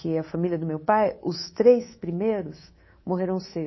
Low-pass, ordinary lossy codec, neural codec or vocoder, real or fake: 7.2 kHz; MP3, 24 kbps; codec, 24 kHz, 3.1 kbps, DualCodec; fake